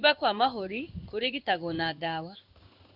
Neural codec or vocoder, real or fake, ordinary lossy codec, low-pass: codec, 16 kHz in and 24 kHz out, 1 kbps, XY-Tokenizer; fake; none; 5.4 kHz